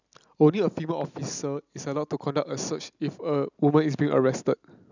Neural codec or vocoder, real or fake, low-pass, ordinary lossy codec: none; real; 7.2 kHz; none